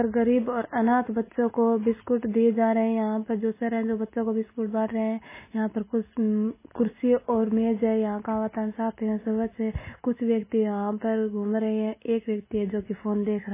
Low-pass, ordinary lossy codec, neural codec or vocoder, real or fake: 3.6 kHz; MP3, 16 kbps; none; real